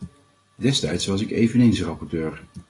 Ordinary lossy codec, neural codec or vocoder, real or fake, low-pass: AAC, 32 kbps; none; real; 10.8 kHz